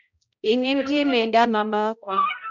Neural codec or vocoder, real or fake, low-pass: codec, 16 kHz, 0.5 kbps, X-Codec, HuBERT features, trained on balanced general audio; fake; 7.2 kHz